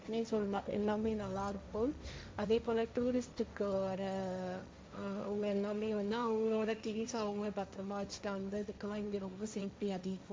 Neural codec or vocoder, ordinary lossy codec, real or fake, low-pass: codec, 16 kHz, 1.1 kbps, Voila-Tokenizer; none; fake; none